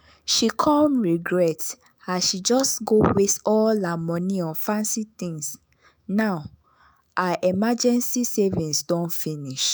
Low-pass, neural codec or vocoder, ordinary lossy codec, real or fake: none; autoencoder, 48 kHz, 128 numbers a frame, DAC-VAE, trained on Japanese speech; none; fake